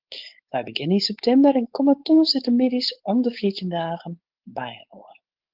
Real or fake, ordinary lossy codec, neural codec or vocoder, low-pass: fake; Opus, 24 kbps; codec, 16 kHz, 4.8 kbps, FACodec; 5.4 kHz